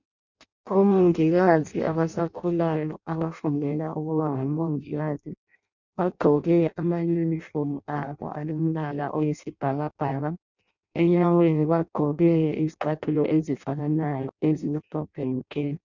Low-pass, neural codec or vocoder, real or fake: 7.2 kHz; codec, 16 kHz in and 24 kHz out, 0.6 kbps, FireRedTTS-2 codec; fake